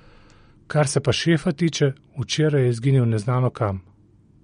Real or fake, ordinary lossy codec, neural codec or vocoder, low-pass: real; MP3, 48 kbps; none; 9.9 kHz